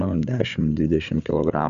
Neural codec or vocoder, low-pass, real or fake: codec, 16 kHz, 8 kbps, FreqCodec, larger model; 7.2 kHz; fake